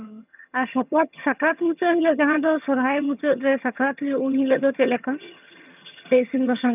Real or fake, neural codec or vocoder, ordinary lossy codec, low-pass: fake; vocoder, 22.05 kHz, 80 mel bands, HiFi-GAN; none; 3.6 kHz